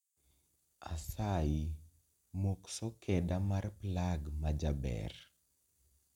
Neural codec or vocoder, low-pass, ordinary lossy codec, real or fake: none; 19.8 kHz; none; real